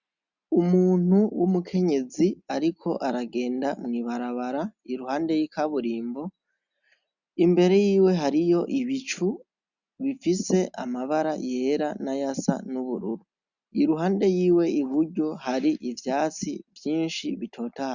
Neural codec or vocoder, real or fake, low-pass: none; real; 7.2 kHz